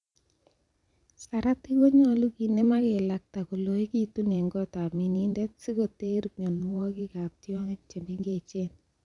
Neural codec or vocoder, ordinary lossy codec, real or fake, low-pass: vocoder, 44.1 kHz, 128 mel bands every 512 samples, BigVGAN v2; none; fake; 10.8 kHz